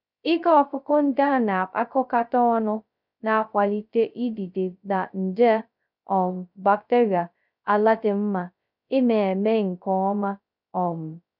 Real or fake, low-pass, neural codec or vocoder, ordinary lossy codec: fake; 5.4 kHz; codec, 16 kHz, 0.2 kbps, FocalCodec; none